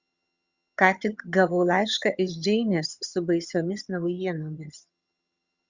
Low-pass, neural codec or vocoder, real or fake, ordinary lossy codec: 7.2 kHz; vocoder, 22.05 kHz, 80 mel bands, HiFi-GAN; fake; Opus, 64 kbps